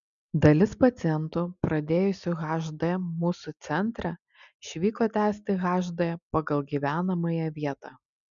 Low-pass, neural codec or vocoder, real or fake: 7.2 kHz; none; real